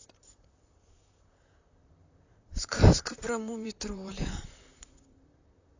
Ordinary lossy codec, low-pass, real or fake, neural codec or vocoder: AAC, 48 kbps; 7.2 kHz; fake; vocoder, 44.1 kHz, 128 mel bands, Pupu-Vocoder